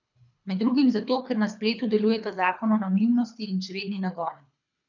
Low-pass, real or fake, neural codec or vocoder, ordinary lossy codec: 7.2 kHz; fake; codec, 24 kHz, 3 kbps, HILCodec; none